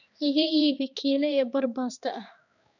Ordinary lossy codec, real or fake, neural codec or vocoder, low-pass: none; fake; codec, 16 kHz, 2 kbps, X-Codec, HuBERT features, trained on balanced general audio; 7.2 kHz